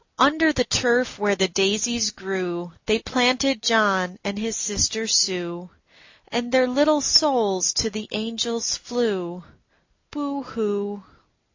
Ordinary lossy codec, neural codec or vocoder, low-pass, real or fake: AAC, 48 kbps; none; 7.2 kHz; real